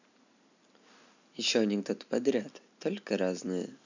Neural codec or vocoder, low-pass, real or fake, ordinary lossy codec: none; 7.2 kHz; real; none